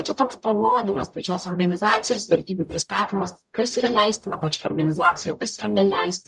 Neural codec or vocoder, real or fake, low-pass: codec, 44.1 kHz, 0.9 kbps, DAC; fake; 10.8 kHz